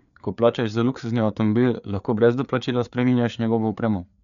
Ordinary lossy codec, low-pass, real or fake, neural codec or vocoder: none; 7.2 kHz; fake; codec, 16 kHz, 4 kbps, FreqCodec, larger model